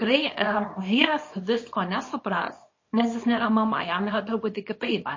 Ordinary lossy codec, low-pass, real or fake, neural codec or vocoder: MP3, 32 kbps; 7.2 kHz; fake; codec, 24 kHz, 0.9 kbps, WavTokenizer, small release